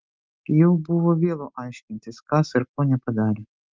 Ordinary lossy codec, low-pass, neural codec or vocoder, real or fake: Opus, 24 kbps; 7.2 kHz; none; real